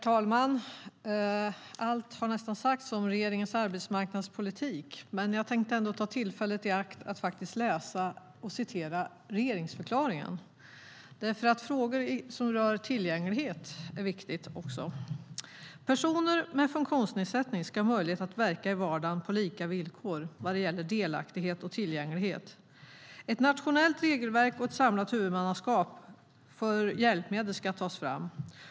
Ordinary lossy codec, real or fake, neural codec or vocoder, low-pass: none; real; none; none